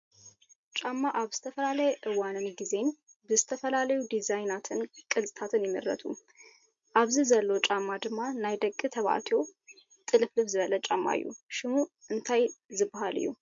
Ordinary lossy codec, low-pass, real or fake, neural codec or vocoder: MP3, 48 kbps; 7.2 kHz; real; none